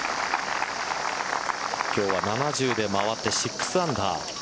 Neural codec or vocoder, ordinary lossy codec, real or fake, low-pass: none; none; real; none